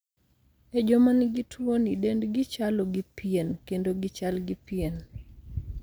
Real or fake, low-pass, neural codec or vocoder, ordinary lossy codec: real; none; none; none